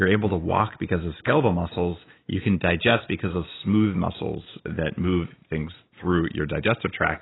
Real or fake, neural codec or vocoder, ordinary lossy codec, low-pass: real; none; AAC, 16 kbps; 7.2 kHz